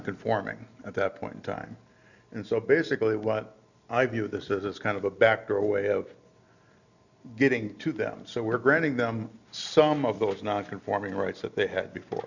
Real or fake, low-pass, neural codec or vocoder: real; 7.2 kHz; none